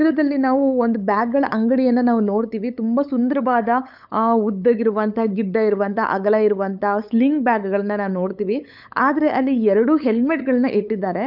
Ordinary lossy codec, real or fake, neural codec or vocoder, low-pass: none; fake; codec, 16 kHz, 8 kbps, FunCodec, trained on LibriTTS, 25 frames a second; 5.4 kHz